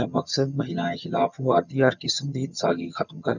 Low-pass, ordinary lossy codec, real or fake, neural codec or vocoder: 7.2 kHz; none; fake; vocoder, 22.05 kHz, 80 mel bands, HiFi-GAN